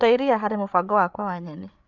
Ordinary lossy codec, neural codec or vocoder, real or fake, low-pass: none; codec, 16 kHz, 8 kbps, FreqCodec, larger model; fake; 7.2 kHz